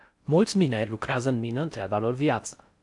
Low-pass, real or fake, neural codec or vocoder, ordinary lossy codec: 10.8 kHz; fake; codec, 16 kHz in and 24 kHz out, 0.6 kbps, FocalCodec, streaming, 4096 codes; AAC, 64 kbps